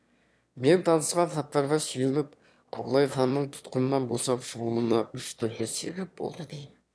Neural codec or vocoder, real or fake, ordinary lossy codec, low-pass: autoencoder, 22.05 kHz, a latent of 192 numbers a frame, VITS, trained on one speaker; fake; none; none